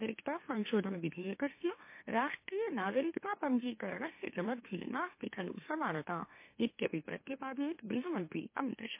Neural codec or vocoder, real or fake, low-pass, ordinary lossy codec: autoencoder, 44.1 kHz, a latent of 192 numbers a frame, MeloTTS; fake; 3.6 kHz; MP3, 24 kbps